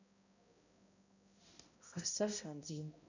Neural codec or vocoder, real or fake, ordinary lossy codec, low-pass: codec, 16 kHz, 0.5 kbps, X-Codec, HuBERT features, trained on balanced general audio; fake; none; 7.2 kHz